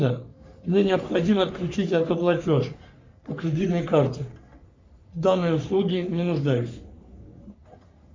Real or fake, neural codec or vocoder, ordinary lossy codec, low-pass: fake; codec, 44.1 kHz, 3.4 kbps, Pupu-Codec; MP3, 48 kbps; 7.2 kHz